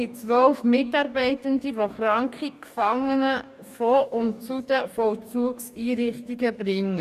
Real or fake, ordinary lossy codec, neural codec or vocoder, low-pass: fake; none; codec, 44.1 kHz, 2.6 kbps, DAC; 14.4 kHz